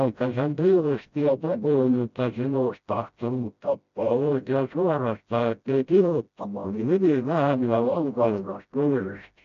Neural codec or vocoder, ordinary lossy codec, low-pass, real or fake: codec, 16 kHz, 0.5 kbps, FreqCodec, smaller model; MP3, 64 kbps; 7.2 kHz; fake